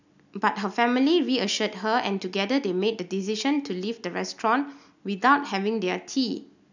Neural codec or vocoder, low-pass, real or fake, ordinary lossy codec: none; 7.2 kHz; real; none